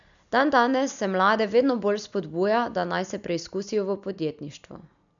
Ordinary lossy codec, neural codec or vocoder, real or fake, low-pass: none; none; real; 7.2 kHz